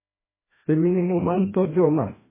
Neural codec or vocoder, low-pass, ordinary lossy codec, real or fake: codec, 16 kHz, 1 kbps, FreqCodec, larger model; 3.6 kHz; MP3, 16 kbps; fake